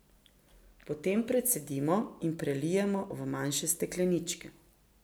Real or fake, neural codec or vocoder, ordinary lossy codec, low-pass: real; none; none; none